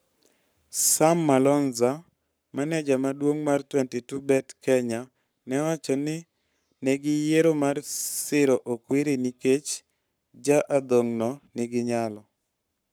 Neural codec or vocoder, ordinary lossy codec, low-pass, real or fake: codec, 44.1 kHz, 7.8 kbps, Pupu-Codec; none; none; fake